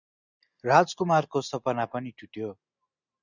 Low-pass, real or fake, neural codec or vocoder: 7.2 kHz; real; none